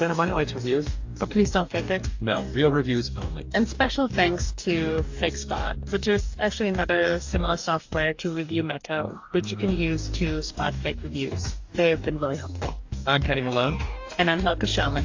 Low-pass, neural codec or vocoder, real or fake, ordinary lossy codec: 7.2 kHz; codec, 44.1 kHz, 2.6 kbps, DAC; fake; AAC, 48 kbps